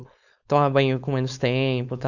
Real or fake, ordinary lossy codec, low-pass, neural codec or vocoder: fake; none; 7.2 kHz; codec, 16 kHz, 4.8 kbps, FACodec